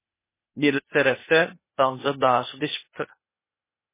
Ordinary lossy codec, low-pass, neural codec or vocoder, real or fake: MP3, 16 kbps; 3.6 kHz; codec, 16 kHz, 0.8 kbps, ZipCodec; fake